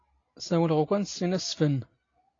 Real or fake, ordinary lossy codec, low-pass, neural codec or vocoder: real; AAC, 32 kbps; 7.2 kHz; none